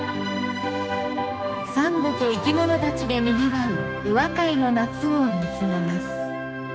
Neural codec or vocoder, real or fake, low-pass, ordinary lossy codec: codec, 16 kHz, 2 kbps, X-Codec, HuBERT features, trained on general audio; fake; none; none